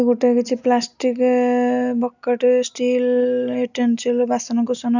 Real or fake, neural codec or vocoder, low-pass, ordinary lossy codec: real; none; 7.2 kHz; none